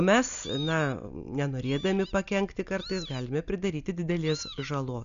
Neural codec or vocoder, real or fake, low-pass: none; real; 7.2 kHz